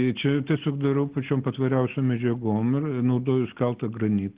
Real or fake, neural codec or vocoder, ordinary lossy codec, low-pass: real; none; Opus, 16 kbps; 3.6 kHz